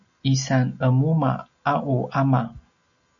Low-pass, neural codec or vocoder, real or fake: 7.2 kHz; none; real